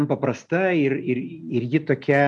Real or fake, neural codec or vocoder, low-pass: real; none; 10.8 kHz